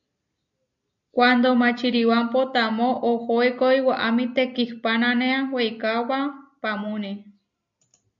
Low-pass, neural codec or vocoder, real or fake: 7.2 kHz; none; real